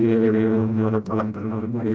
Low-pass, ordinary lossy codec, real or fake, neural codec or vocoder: none; none; fake; codec, 16 kHz, 0.5 kbps, FreqCodec, smaller model